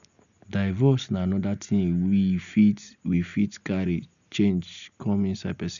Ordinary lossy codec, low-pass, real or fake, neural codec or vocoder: none; 7.2 kHz; real; none